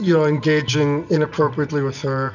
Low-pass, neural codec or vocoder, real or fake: 7.2 kHz; none; real